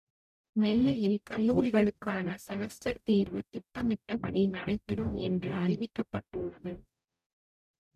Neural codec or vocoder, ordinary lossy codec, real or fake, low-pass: codec, 44.1 kHz, 0.9 kbps, DAC; none; fake; 14.4 kHz